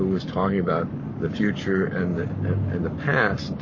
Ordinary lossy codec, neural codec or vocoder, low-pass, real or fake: MP3, 32 kbps; codec, 44.1 kHz, 7.8 kbps, Pupu-Codec; 7.2 kHz; fake